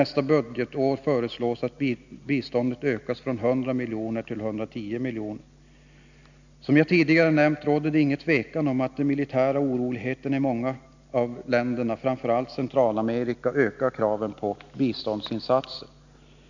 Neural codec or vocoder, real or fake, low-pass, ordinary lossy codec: none; real; 7.2 kHz; none